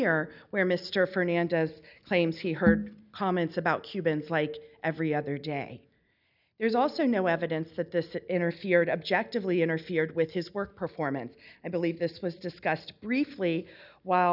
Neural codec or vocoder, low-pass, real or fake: none; 5.4 kHz; real